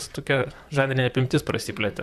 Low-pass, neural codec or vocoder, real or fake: 14.4 kHz; vocoder, 44.1 kHz, 128 mel bands, Pupu-Vocoder; fake